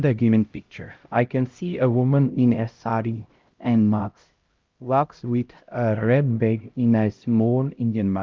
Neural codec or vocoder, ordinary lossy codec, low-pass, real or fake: codec, 16 kHz, 0.5 kbps, X-Codec, HuBERT features, trained on LibriSpeech; Opus, 32 kbps; 7.2 kHz; fake